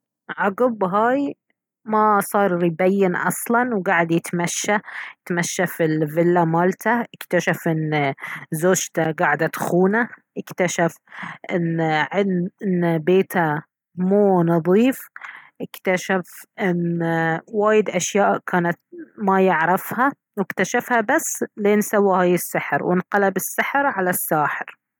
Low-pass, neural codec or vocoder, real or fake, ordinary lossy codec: 19.8 kHz; none; real; none